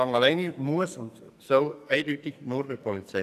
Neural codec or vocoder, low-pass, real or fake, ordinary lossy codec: codec, 44.1 kHz, 2.6 kbps, SNAC; 14.4 kHz; fake; AAC, 96 kbps